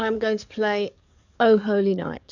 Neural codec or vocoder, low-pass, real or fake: codec, 44.1 kHz, 7.8 kbps, DAC; 7.2 kHz; fake